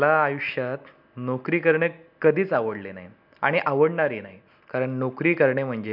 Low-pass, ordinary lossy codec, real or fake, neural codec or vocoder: 5.4 kHz; none; real; none